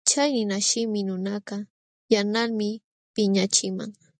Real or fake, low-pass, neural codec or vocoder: real; 9.9 kHz; none